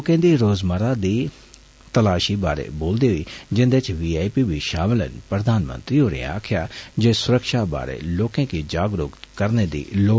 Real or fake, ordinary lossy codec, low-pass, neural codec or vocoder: real; none; none; none